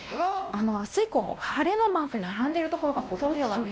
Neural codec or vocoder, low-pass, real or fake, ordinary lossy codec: codec, 16 kHz, 1 kbps, X-Codec, WavLM features, trained on Multilingual LibriSpeech; none; fake; none